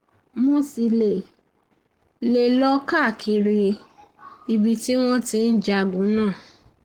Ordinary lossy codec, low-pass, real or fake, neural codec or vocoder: Opus, 16 kbps; 19.8 kHz; fake; codec, 44.1 kHz, 7.8 kbps, Pupu-Codec